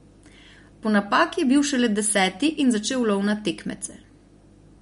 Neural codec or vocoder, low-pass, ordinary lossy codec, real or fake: none; 10.8 kHz; MP3, 48 kbps; real